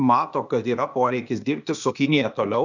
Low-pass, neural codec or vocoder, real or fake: 7.2 kHz; codec, 16 kHz, 0.8 kbps, ZipCodec; fake